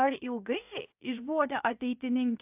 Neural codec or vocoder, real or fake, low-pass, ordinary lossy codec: codec, 16 kHz, 0.7 kbps, FocalCodec; fake; 3.6 kHz; AAC, 32 kbps